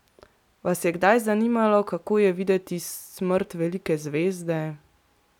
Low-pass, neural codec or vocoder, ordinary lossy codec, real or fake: 19.8 kHz; none; none; real